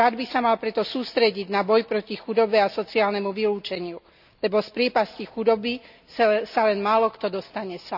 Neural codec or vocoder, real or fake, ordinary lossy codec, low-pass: none; real; none; 5.4 kHz